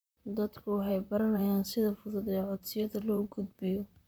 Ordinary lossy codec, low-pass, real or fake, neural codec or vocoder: none; none; fake; vocoder, 44.1 kHz, 128 mel bands, Pupu-Vocoder